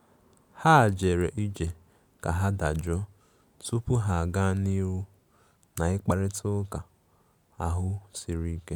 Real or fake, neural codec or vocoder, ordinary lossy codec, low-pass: real; none; none; none